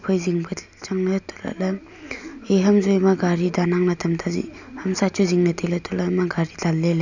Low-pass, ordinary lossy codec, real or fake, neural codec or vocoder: 7.2 kHz; none; real; none